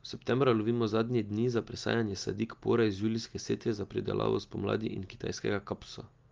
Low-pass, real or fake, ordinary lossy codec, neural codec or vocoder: 7.2 kHz; real; Opus, 32 kbps; none